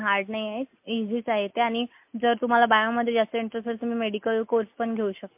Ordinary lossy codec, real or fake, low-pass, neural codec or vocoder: MP3, 32 kbps; real; 3.6 kHz; none